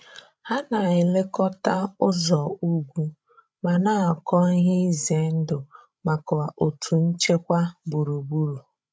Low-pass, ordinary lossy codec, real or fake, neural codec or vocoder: none; none; fake; codec, 16 kHz, 16 kbps, FreqCodec, larger model